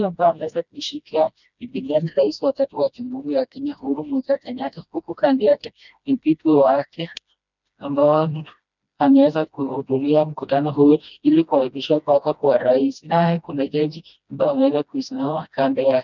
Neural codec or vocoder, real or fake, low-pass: codec, 16 kHz, 1 kbps, FreqCodec, smaller model; fake; 7.2 kHz